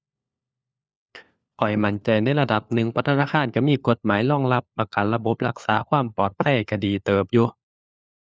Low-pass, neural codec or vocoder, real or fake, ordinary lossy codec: none; codec, 16 kHz, 4 kbps, FunCodec, trained on LibriTTS, 50 frames a second; fake; none